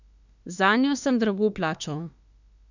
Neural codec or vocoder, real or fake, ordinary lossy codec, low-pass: autoencoder, 48 kHz, 32 numbers a frame, DAC-VAE, trained on Japanese speech; fake; none; 7.2 kHz